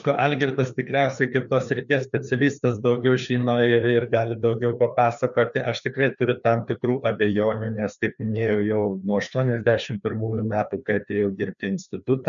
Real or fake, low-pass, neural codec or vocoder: fake; 7.2 kHz; codec, 16 kHz, 2 kbps, FreqCodec, larger model